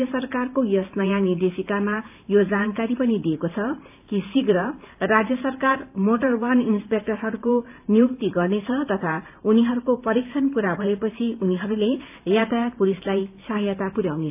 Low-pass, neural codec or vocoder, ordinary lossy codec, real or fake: 3.6 kHz; vocoder, 44.1 kHz, 128 mel bands every 512 samples, BigVGAN v2; AAC, 32 kbps; fake